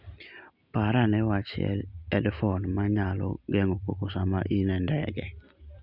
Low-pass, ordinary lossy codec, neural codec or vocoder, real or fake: 5.4 kHz; none; none; real